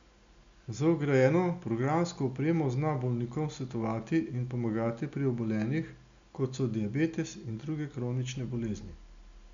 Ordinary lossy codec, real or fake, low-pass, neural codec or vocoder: MP3, 64 kbps; real; 7.2 kHz; none